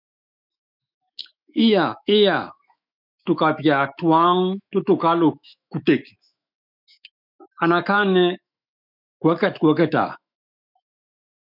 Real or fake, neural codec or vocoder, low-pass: fake; autoencoder, 48 kHz, 128 numbers a frame, DAC-VAE, trained on Japanese speech; 5.4 kHz